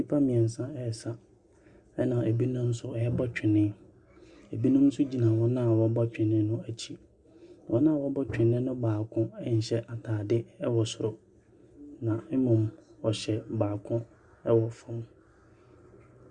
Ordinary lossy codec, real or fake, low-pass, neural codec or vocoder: Opus, 64 kbps; real; 10.8 kHz; none